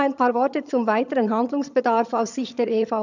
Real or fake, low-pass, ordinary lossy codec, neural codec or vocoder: fake; 7.2 kHz; none; vocoder, 22.05 kHz, 80 mel bands, HiFi-GAN